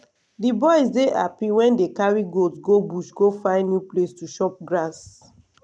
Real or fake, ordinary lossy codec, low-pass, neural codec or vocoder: real; none; none; none